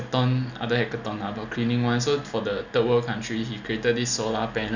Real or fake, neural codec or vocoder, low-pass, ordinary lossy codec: real; none; 7.2 kHz; none